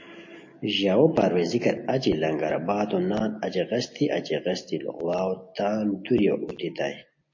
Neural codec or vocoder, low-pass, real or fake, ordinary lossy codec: none; 7.2 kHz; real; MP3, 32 kbps